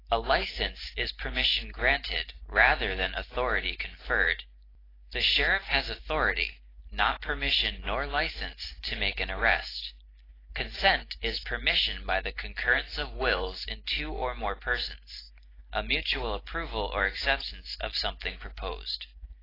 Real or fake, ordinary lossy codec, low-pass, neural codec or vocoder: real; AAC, 24 kbps; 5.4 kHz; none